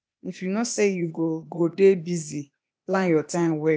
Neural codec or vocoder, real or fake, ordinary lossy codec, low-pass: codec, 16 kHz, 0.8 kbps, ZipCodec; fake; none; none